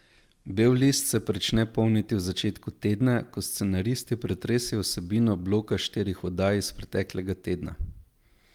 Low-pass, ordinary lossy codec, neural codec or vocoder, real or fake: 19.8 kHz; Opus, 32 kbps; none; real